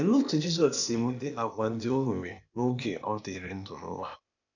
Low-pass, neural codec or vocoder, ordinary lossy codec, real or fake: 7.2 kHz; codec, 16 kHz, 0.8 kbps, ZipCodec; none; fake